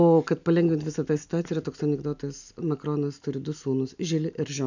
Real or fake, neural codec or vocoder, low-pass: real; none; 7.2 kHz